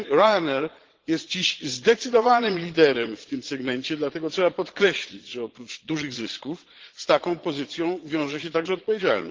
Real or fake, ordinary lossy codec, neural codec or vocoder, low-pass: fake; Opus, 16 kbps; vocoder, 22.05 kHz, 80 mel bands, Vocos; 7.2 kHz